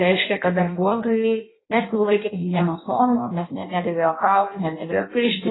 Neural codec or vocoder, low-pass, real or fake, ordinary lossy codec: codec, 16 kHz in and 24 kHz out, 0.6 kbps, FireRedTTS-2 codec; 7.2 kHz; fake; AAC, 16 kbps